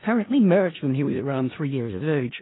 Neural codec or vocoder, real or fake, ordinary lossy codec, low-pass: codec, 16 kHz in and 24 kHz out, 0.4 kbps, LongCat-Audio-Codec, four codebook decoder; fake; AAC, 16 kbps; 7.2 kHz